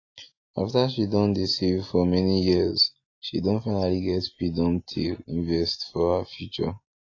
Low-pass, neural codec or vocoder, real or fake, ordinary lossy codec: 7.2 kHz; none; real; AAC, 32 kbps